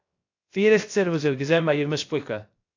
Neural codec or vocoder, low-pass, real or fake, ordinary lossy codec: codec, 16 kHz, 0.3 kbps, FocalCodec; 7.2 kHz; fake; AAC, 48 kbps